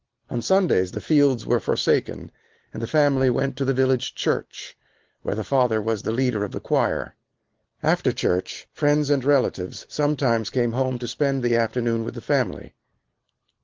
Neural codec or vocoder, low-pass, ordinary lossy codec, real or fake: none; 7.2 kHz; Opus, 16 kbps; real